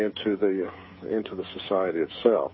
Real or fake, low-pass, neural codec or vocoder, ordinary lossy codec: fake; 7.2 kHz; codec, 16 kHz, 16 kbps, FreqCodec, smaller model; MP3, 24 kbps